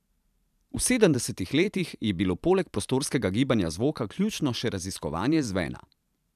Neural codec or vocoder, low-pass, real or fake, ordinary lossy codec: vocoder, 44.1 kHz, 128 mel bands every 512 samples, BigVGAN v2; 14.4 kHz; fake; none